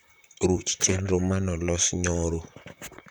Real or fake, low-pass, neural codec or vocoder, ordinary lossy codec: fake; none; vocoder, 44.1 kHz, 128 mel bands, Pupu-Vocoder; none